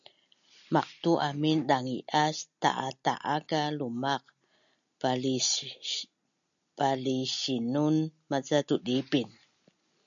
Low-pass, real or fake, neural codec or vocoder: 7.2 kHz; real; none